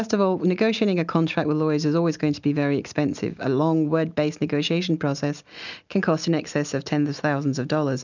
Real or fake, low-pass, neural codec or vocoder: real; 7.2 kHz; none